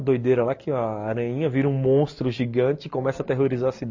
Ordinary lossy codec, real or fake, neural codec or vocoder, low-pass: MP3, 48 kbps; real; none; 7.2 kHz